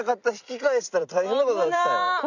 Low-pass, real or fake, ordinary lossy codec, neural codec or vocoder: 7.2 kHz; fake; none; vocoder, 44.1 kHz, 128 mel bands every 256 samples, BigVGAN v2